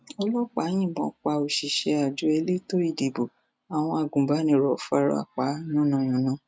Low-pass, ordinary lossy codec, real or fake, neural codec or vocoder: none; none; real; none